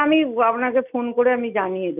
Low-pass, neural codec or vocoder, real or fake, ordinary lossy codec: 3.6 kHz; none; real; none